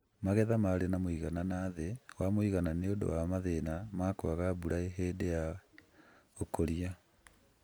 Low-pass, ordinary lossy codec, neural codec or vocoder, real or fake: none; none; none; real